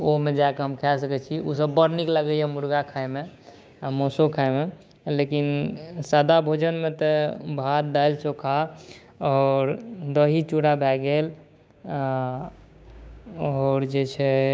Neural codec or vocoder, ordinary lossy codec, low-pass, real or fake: codec, 16 kHz, 6 kbps, DAC; none; none; fake